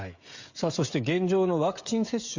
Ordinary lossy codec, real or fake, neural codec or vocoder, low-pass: Opus, 64 kbps; fake; codec, 16 kHz, 16 kbps, FreqCodec, smaller model; 7.2 kHz